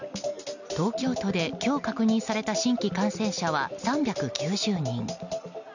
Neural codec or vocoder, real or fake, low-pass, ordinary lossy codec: none; real; 7.2 kHz; none